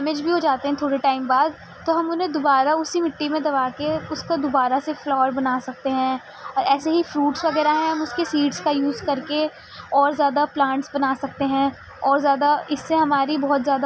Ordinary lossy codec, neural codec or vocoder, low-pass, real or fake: none; none; none; real